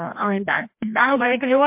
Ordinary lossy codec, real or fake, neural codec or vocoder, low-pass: MP3, 32 kbps; fake; codec, 16 kHz, 1 kbps, FreqCodec, larger model; 3.6 kHz